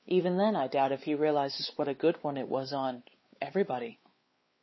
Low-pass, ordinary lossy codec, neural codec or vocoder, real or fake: 7.2 kHz; MP3, 24 kbps; codec, 16 kHz, 4 kbps, X-Codec, WavLM features, trained on Multilingual LibriSpeech; fake